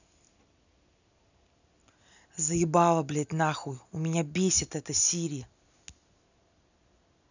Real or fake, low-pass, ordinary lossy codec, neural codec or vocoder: real; 7.2 kHz; none; none